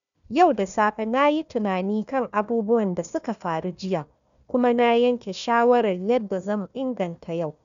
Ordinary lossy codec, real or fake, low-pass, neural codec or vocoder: none; fake; 7.2 kHz; codec, 16 kHz, 1 kbps, FunCodec, trained on Chinese and English, 50 frames a second